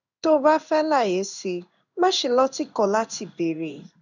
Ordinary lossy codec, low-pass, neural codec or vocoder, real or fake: none; 7.2 kHz; codec, 16 kHz in and 24 kHz out, 1 kbps, XY-Tokenizer; fake